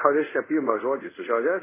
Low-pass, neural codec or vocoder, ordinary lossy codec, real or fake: 3.6 kHz; codec, 24 kHz, 0.5 kbps, DualCodec; MP3, 16 kbps; fake